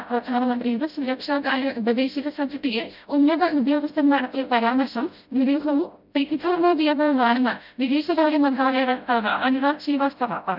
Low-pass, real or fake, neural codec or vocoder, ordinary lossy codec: 5.4 kHz; fake; codec, 16 kHz, 0.5 kbps, FreqCodec, smaller model; none